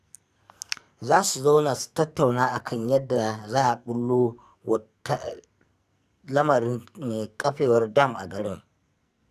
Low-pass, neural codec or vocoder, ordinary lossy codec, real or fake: 14.4 kHz; codec, 44.1 kHz, 2.6 kbps, SNAC; none; fake